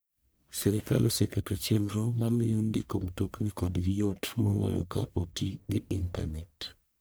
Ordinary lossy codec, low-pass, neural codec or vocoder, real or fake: none; none; codec, 44.1 kHz, 1.7 kbps, Pupu-Codec; fake